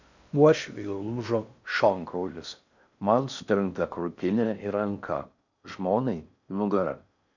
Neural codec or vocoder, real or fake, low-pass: codec, 16 kHz in and 24 kHz out, 0.6 kbps, FocalCodec, streaming, 2048 codes; fake; 7.2 kHz